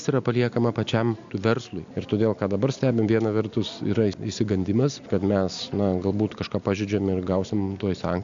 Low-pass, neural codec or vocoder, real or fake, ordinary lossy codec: 7.2 kHz; none; real; MP3, 64 kbps